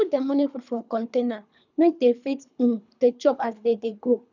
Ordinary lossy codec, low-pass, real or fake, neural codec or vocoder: none; 7.2 kHz; fake; codec, 24 kHz, 3 kbps, HILCodec